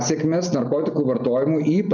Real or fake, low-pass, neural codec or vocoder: real; 7.2 kHz; none